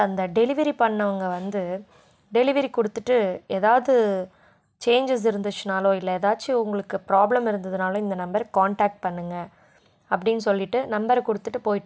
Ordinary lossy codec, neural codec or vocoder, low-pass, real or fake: none; none; none; real